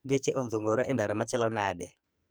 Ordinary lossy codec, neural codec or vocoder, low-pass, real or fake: none; codec, 44.1 kHz, 2.6 kbps, SNAC; none; fake